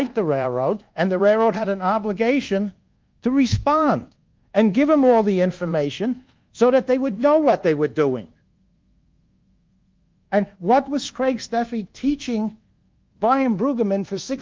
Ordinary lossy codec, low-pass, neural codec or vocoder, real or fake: Opus, 32 kbps; 7.2 kHz; codec, 24 kHz, 1.2 kbps, DualCodec; fake